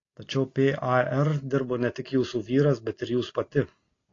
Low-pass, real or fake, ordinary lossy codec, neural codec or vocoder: 7.2 kHz; real; AAC, 32 kbps; none